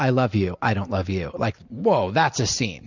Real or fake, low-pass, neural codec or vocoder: real; 7.2 kHz; none